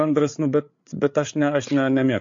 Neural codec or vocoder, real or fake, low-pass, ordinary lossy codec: codec, 16 kHz, 8 kbps, FreqCodec, larger model; fake; 7.2 kHz; MP3, 48 kbps